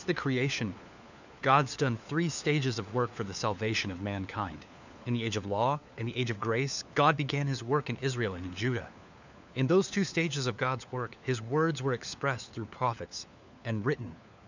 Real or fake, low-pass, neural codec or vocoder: fake; 7.2 kHz; codec, 16 kHz, 4 kbps, FunCodec, trained on LibriTTS, 50 frames a second